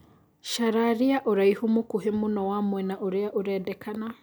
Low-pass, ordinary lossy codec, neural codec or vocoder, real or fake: none; none; none; real